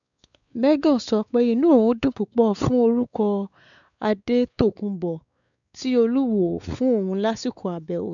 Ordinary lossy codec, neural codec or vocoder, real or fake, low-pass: none; codec, 16 kHz, 4 kbps, X-Codec, WavLM features, trained on Multilingual LibriSpeech; fake; 7.2 kHz